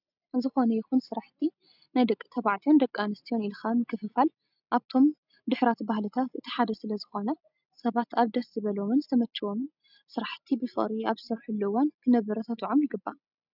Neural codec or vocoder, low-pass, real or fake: none; 5.4 kHz; real